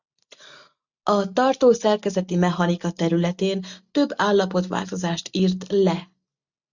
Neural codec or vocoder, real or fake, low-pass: none; real; 7.2 kHz